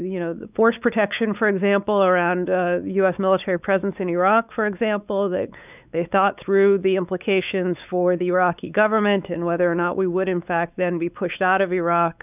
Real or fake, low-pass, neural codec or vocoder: fake; 3.6 kHz; codec, 16 kHz, 4 kbps, X-Codec, WavLM features, trained on Multilingual LibriSpeech